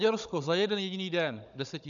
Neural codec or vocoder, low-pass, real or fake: codec, 16 kHz, 16 kbps, FunCodec, trained on Chinese and English, 50 frames a second; 7.2 kHz; fake